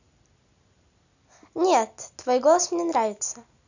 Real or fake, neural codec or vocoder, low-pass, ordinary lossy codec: real; none; 7.2 kHz; none